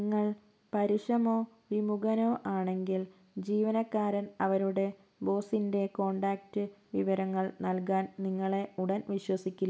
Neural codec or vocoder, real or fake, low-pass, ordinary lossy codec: none; real; none; none